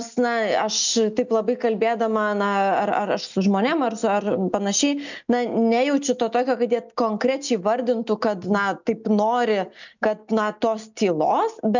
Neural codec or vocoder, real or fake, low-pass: none; real; 7.2 kHz